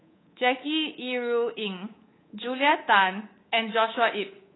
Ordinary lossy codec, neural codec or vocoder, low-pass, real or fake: AAC, 16 kbps; codec, 24 kHz, 3.1 kbps, DualCodec; 7.2 kHz; fake